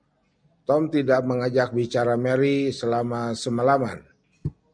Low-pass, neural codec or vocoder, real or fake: 9.9 kHz; none; real